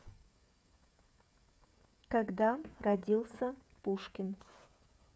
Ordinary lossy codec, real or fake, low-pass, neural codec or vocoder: none; fake; none; codec, 16 kHz, 16 kbps, FreqCodec, smaller model